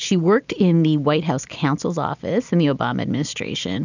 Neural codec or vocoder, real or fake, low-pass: none; real; 7.2 kHz